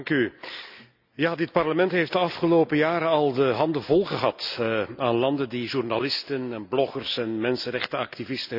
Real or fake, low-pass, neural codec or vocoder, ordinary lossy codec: real; 5.4 kHz; none; none